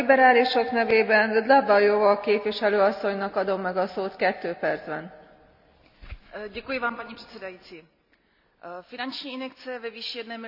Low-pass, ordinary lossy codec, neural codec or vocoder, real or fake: 5.4 kHz; none; none; real